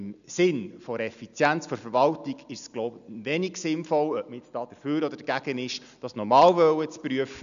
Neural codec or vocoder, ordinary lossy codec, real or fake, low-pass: none; none; real; 7.2 kHz